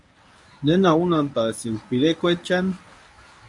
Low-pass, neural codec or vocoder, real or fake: 10.8 kHz; codec, 24 kHz, 0.9 kbps, WavTokenizer, medium speech release version 1; fake